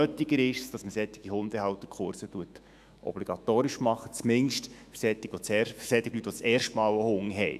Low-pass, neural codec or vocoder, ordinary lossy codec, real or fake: 14.4 kHz; autoencoder, 48 kHz, 128 numbers a frame, DAC-VAE, trained on Japanese speech; none; fake